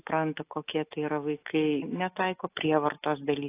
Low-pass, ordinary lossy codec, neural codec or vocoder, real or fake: 3.6 kHz; AAC, 24 kbps; none; real